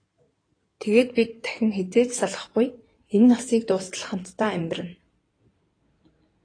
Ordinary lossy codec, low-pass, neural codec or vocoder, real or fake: AAC, 32 kbps; 9.9 kHz; codec, 16 kHz in and 24 kHz out, 2.2 kbps, FireRedTTS-2 codec; fake